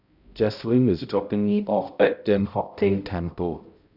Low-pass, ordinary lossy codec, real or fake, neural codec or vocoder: 5.4 kHz; Opus, 64 kbps; fake; codec, 16 kHz, 0.5 kbps, X-Codec, HuBERT features, trained on balanced general audio